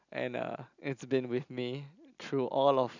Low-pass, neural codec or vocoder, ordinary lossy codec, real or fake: 7.2 kHz; none; none; real